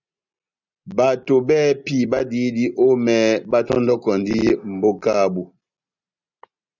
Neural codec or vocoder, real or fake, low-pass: none; real; 7.2 kHz